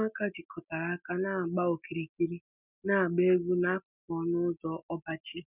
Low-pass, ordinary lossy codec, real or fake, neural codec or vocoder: 3.6 kHz; none; real; none